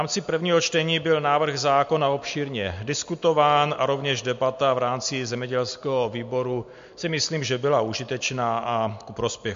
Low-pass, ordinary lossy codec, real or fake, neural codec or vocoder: 7.2 kHz; MP3, 48 kbps; real; none